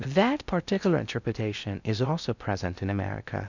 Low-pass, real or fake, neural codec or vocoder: 7.2 kHz; fake; codec, 16 kHz in and 24 kHz out, 0.6 kbps, FocalCodec, streaming, 2048 codes